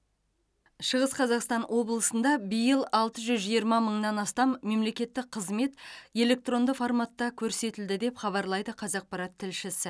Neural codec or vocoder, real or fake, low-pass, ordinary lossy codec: none; real; none; none